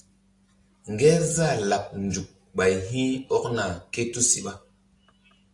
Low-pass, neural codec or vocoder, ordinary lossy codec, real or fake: 10.8 kHz; none; AAC, 48 kbps; real